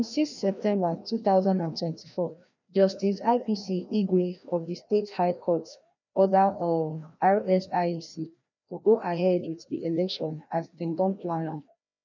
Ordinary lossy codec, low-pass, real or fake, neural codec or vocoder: none; 7.2 kHz; fake; codec, 16 kHz, 1 kbps, FreqCodec, larger model